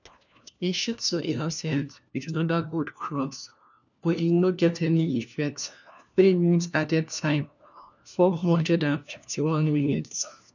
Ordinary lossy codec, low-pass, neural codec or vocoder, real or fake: none; 7.2 kHz; codec, 16 kHz, 1 kbps, FunCodec, trained on LibriTTS, 50 frames a second; fake